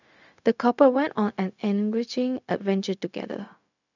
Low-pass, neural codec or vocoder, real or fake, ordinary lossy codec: 7.2 kHz; codec, 16 kHz, 0.4 kbps, LongCat-Audio-Codec; fake; none